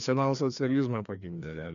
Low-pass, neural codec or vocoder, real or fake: 7.2 kHz; codec, 16 kHz, 2 kbps, FreqCodec, larger model; fake